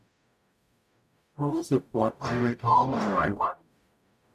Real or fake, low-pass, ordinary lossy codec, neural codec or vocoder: fake; 14.4 kHz; none; codec, 44.1 kHz, 0.9 kbps, DAC